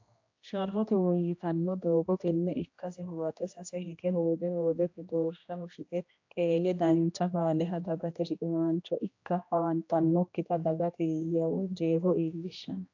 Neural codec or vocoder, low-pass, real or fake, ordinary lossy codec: codec, 16 kHz, 1 kbps, X-Codec, HuBERT features, trained on general audio; 7.2 kHz; fake; AAC, 48 kbps